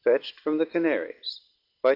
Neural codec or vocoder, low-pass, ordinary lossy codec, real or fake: none; 5.4 kHz; Opus, 32 kbps; real